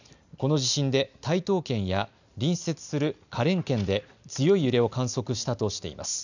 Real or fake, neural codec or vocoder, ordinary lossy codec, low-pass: real; none; none; 7.2 kHz